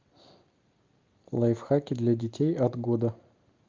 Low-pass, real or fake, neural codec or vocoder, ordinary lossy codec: 7.2 kHz; real; none; Opus, 32 kbps